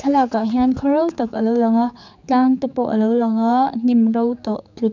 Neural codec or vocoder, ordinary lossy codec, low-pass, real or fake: codec, 16 kHz, 4 kbps, X-Codec, HuBERT features, trained on general audio; none; 7.2 kHz; fake